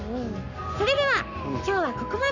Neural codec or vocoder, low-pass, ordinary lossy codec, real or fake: codec, 44.1 kHz, 7.8 kbps, Pupu-Codec; 7.2 kHz; none; fake